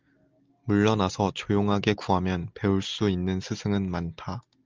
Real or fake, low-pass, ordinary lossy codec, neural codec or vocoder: real; 7.2 kHz; Opus, 24 kbps; none